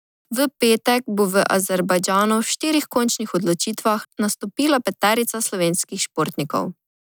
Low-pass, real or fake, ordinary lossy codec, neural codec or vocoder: none; real; none; none